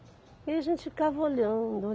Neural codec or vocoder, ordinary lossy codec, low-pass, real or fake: none; none; none; real